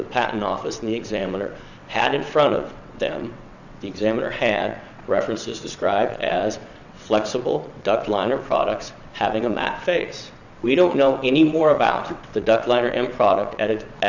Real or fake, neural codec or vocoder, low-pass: fake; vocoder, 22.05 kHz, 80 mel bands, WaveNeXt; 7.2 kHz